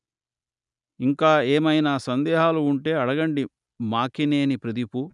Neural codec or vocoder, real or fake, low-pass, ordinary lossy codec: none; real; 10.8 kHz; none